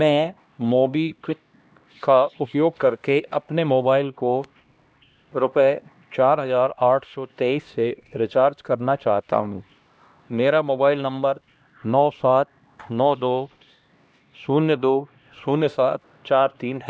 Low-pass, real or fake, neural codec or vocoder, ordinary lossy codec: none; fake; codec, 16 kHz, 1 kbps, X-Codec, HuBERT features, trained on LibriSpeech; none